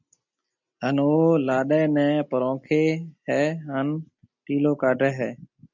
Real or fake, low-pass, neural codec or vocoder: real; 7.2 kHz; none